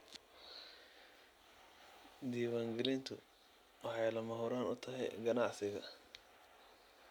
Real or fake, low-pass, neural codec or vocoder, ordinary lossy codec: real; none; none; none